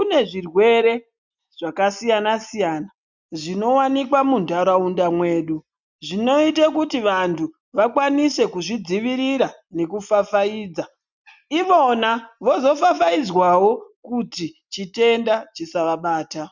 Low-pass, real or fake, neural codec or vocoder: 7.2 kHz; real; none